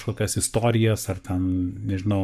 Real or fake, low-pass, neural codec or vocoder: fake; 14.4 kHz; codec, 44.1 kHz, 7.8 kbps, Pupu-Codec